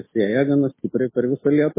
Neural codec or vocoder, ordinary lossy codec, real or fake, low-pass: none; MP3, 16 kbps; real; 3.6 kHz